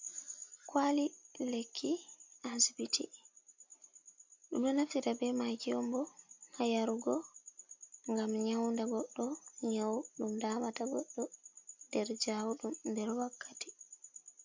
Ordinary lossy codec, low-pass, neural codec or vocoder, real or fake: MP3, 64 kbps; 7.2 kHz; none; real